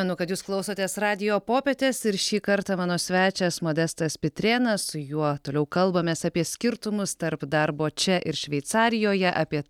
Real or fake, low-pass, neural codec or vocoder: real; 19.8 kHz; none